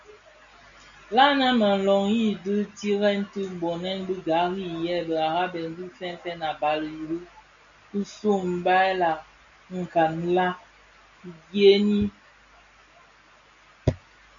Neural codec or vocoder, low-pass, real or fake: none; 7.2 kHz; real